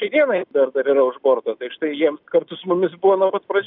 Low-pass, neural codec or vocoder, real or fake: 5.4 kHz; none; real